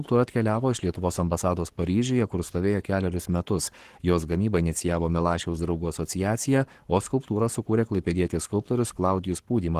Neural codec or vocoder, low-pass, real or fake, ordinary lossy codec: codec, 44.1 kHz, 7.8 kbps, Pupu-Codec; 14.4 kHz; fake; Opus, 16 kbps